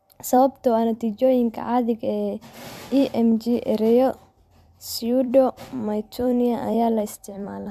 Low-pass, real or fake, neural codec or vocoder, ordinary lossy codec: 14.4 kHz; fake; vocoder, 44.1 kHz, 128 mel bands every 256 samples, BigVGAN v2; MP3, 96 kbps